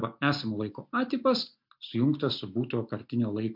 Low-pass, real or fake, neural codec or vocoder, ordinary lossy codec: 5.4 kHz; real; none; MP3, 48 kbps